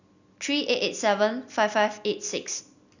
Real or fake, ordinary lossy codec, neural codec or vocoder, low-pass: real; none; none; 7.2 kHz